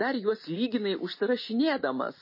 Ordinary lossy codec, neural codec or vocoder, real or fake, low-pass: MP3, 24 kbps; none; real; 5.4 kHz